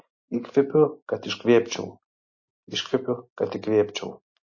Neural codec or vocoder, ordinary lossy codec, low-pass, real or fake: none; MP3, 32 kbps; 7.2 kHz; real